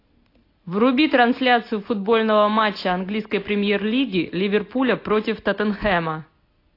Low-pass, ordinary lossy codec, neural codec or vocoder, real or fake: 5.4 kHz; AAC, 32 kbps; none; real